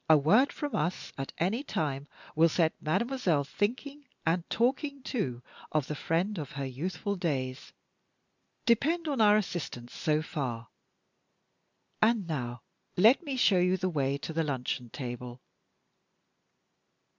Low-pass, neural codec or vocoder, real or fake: 7.2 kHz; none; real